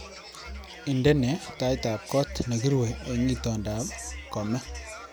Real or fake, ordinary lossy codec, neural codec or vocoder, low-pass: real; none; none; none